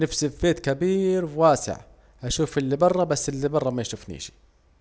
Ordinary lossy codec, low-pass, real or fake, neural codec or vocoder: none; none; real; none